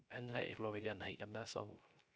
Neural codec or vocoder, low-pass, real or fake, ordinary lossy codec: codec, 16 kHz, 0.3 kbps, FocalCodec; none; fake; none